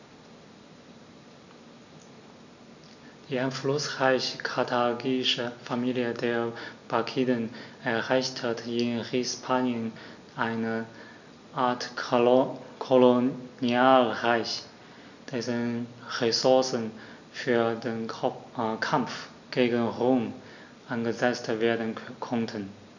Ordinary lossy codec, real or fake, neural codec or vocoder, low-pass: none; real; none; 7.2 kHz